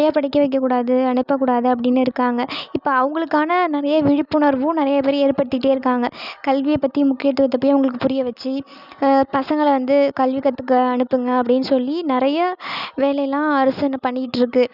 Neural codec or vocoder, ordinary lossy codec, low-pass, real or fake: none; none; 5.4 kHz; real